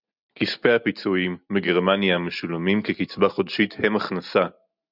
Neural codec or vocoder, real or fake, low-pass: none; real; 5.4 kHz